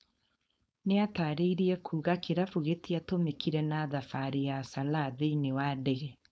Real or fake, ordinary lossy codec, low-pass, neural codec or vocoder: fake; none; none; codec, 16 kHz, 4.8 kbps, FACodec